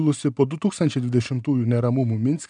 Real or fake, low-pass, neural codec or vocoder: real; 9.9 kHz; none